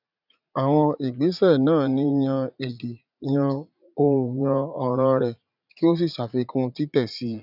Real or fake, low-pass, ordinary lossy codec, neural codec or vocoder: fake; 5.4 kHz; none; vocoder, 44.1 kHz, 80 mel bands, Vocos